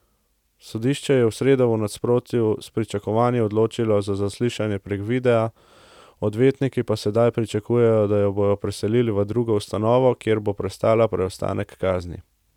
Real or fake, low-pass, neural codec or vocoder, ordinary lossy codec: real; 19.8 kHz; none; none